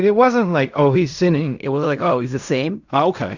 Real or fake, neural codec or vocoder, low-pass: fake; codec, 16 kHz in and 24 kHz out, 0.4 kbps, LongCat-Audio-Codec, fine tuned four codebook decoder; 7.2 kHz